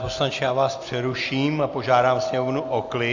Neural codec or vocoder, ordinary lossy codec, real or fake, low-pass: none; AAC, 48 kbps; real; 7.2 kHz